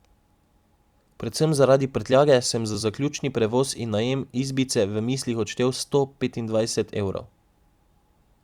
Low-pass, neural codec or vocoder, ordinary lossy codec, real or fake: 19.8 kHz; vocoder, 44.1 kHz, 128 mel bands every 256 samples, BigVGAN v2; Opus, 64 kbps; fake